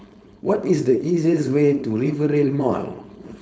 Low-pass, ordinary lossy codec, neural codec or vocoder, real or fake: none; none; codec, 16 kHz, 4.8 kbps, FACodec; fake